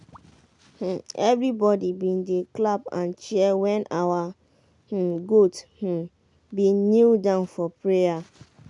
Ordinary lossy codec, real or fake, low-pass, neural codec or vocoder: none; real; 10.8 kHz; none